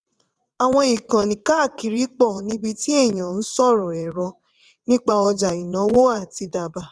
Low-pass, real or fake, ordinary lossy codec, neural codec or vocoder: none; fake; none; vocoder, 22.05 kHz, 80 mel bands, WaveNeXt